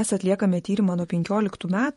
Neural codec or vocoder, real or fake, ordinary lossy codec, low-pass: vocoder, 48 kHz, 128 mel bands, Vocos; fake; MP3, 48 kbps; 19.8 kHz